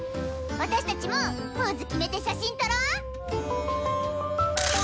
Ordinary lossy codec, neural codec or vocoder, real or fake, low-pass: none; none; real; none